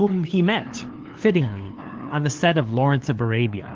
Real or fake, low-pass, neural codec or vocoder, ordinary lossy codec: fake; 7.2 kHz; codec, 16 kHz, 2 kbps, FunCodec, trained on LibriTTS, 25 frames a second; Opus, 16 kbps